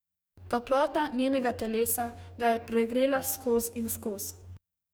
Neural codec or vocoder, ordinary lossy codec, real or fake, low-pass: codec, 44.1 kHz, 2.6 kbps, DAC; none; fake; none